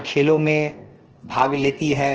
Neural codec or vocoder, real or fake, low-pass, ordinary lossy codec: codec, 16 kHz, 0.7 kbps, FocalCodec; fake; 7.2 kHz; Opus, 16 kbps